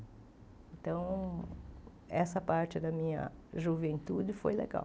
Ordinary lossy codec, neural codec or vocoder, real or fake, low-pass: none; none; real; none